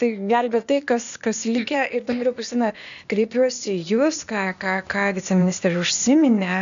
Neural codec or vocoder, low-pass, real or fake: codec, 16 kHz, 0.8 kbps, ZipCodec; 7.2 kHz; fake